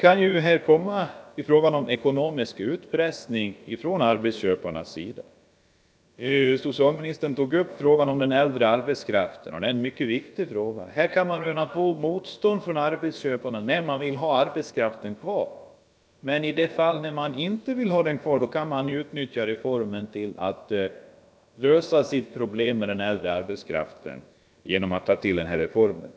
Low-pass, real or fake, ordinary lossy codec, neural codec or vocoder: none; fake; none; codec, 16 kHz, about 1 kbps, DyCAST, with the encoder's durations